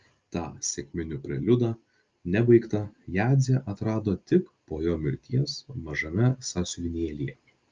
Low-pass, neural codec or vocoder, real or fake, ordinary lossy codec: 7.2 kHz; none; real; Opus, 24 kbps